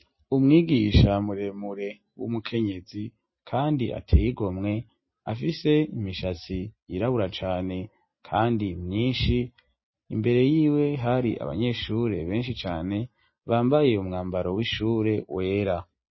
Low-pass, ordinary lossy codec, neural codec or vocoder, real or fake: 7.2 kHz; MP3, 24 kbps; none; real